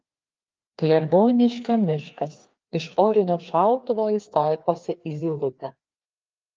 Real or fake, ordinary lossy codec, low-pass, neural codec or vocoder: fake; Opus, 24 kbps; 7.2 kHz; codec, 16 kHz, 2 kbps, FreqCodec, larger model